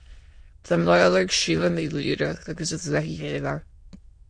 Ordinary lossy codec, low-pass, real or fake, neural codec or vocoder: MP3, 48 kbps; 9.9 kHz; fake; autoencoder, 22.05 kHz, a latent of 192 numbers a frame, VITS, trained on many speakers